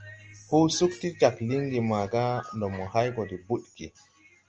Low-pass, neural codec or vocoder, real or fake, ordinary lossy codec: 7.2 kHz; none; real; Opus, 24 kbps